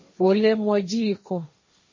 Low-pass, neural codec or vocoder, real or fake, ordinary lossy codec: 7.2 kHz; codec, 16 kHz, 1.1 kbps, Voila-Tokenizer; fake; MP3, 32 kbps